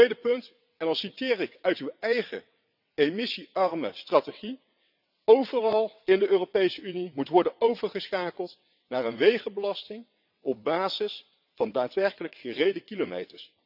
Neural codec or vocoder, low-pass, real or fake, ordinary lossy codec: vocoder, 22.05 kHz, 80 mel bands, WaveNeXt; 5.4 kHz; fake; none